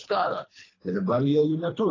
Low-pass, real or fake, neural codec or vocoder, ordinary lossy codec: 7.2 kHz; fake; codec, 24 kHz, 3 kbps, HILCodec; AAC, 32 kbps